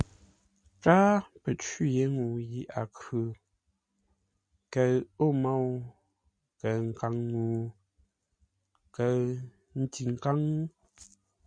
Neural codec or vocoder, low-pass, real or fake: none; 9.9 kHz; real